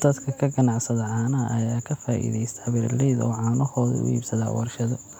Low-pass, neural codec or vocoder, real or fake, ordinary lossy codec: 19.8 kHz; none; real; none